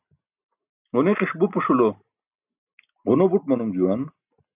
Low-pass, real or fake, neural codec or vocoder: 3.6 kHz; real; none